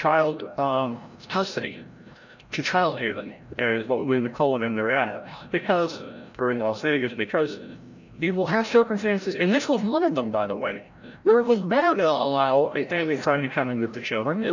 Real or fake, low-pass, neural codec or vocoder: fake; 7.2 kHz; codec, 16 kHz, 0.5 kbps, FreqCodec, larger model